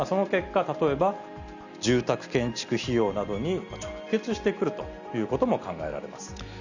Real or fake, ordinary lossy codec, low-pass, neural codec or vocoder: real; none; 7.2 kHz; none